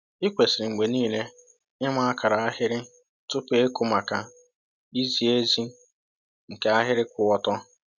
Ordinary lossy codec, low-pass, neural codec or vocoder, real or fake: none; 7.2 kHz; none; real